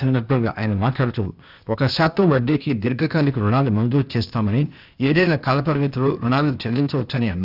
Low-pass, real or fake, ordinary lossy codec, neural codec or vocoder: 5.4 kHz; fake; none; codec, 16 kHz, 0.8 kbps, ZipCodec